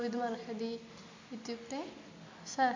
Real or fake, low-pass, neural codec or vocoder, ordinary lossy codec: real; 7.2 kHz; none; MP3, 48 kbps